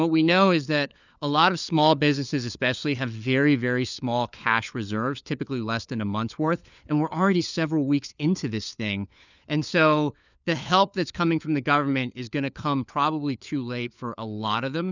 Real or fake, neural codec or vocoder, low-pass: fake; codec, 16 kHz, 4 kbps, FunCodec, trained on LibriTTS, 50 frames a second; 7.2 kHz